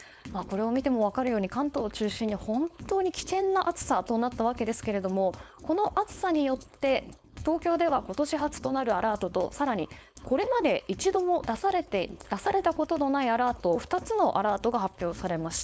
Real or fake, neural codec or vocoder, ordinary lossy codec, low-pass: fake; codec, 16 kHz, 4.8 kbps, FACodec; none; none